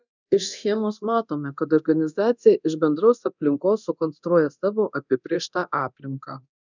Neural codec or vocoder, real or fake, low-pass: codec, 24 kHz, 0.9 kbps, DualCodec; fake; 7.2 kHz